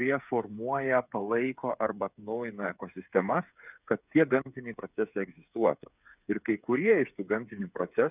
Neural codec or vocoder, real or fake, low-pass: codec, 16 kHz, 8 kbps, FreqCodec, smaller model; fake; 3.6 kHz